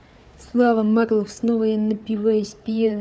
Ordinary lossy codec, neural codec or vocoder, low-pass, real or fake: none; codec, 16 kHz, 4 kbps, FunCodec, trained on Chinese and English, 50 frames a second; none; fake